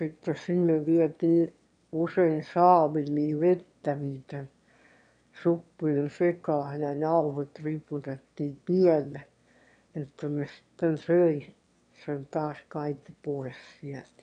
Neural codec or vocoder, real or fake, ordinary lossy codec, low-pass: autoencoder, 22.05 kHz, a latent of 192 numbers a frame, VITS, trained on one speaker; fake; none; 9.9 kHz